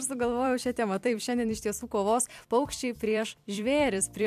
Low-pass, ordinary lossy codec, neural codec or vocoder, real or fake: 14.4 kHz; MP3, 96 kbps; vocoder, 44.1 kHz, 128 mel bands every 256 samples, BigVGAN v2; fake